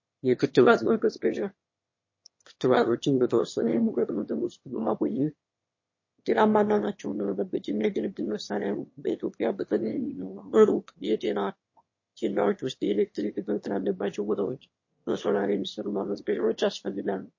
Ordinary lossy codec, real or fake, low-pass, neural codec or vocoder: MP3, 32 kbps; fake; 7.2 kHz; autoencoder, 22.05 kHz, a latent of 192 numbers a frame, VITS, trained on one speaker